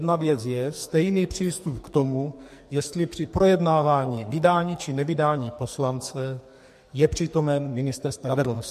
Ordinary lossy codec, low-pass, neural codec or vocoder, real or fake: MP3, 64 kbps; 14.4 kHz; codec, 44.1 kHz, 2.6 kbps, SNAC; fake